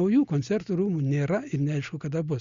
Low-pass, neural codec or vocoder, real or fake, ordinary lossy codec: 7.2 kHz; none; real; Opus, 64 kbps